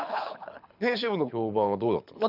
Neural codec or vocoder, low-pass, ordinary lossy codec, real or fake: codec, 24 kHz, 6 kbps, HILCodec; 5.4 kHz; none; fake